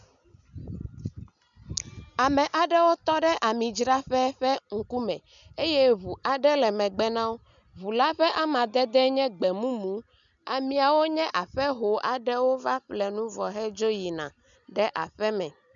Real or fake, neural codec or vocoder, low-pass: real; none; 7.2 kHz